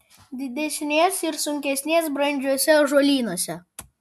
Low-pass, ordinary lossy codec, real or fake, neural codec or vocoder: 14.4 kHz; MP3, 96 kbps; real; none